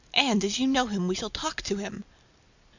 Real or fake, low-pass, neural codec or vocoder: real; 7.2 kHz; none